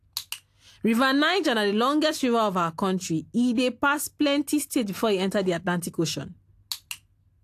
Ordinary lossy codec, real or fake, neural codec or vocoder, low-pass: AAC, 64 kbps; real; none; 14.4 kHz